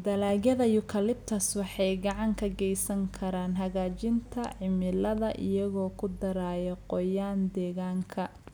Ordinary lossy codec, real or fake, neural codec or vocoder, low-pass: none; real; none; none